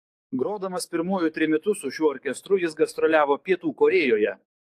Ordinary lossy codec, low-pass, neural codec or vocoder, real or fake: AAC, 64 kbps; 14.4 kHz; codec, 44.1 kHz, 7.8 kbps, DAC; fake